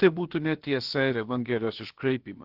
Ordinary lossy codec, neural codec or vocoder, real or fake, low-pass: Opus, 16 kbps; codec, 16 kHz, about 1 kbps, DyCAST, with the encoder's durations; fake; 5.4 kHz